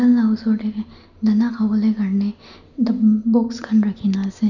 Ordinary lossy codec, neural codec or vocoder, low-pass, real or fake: none; none; 7.2 kHz; real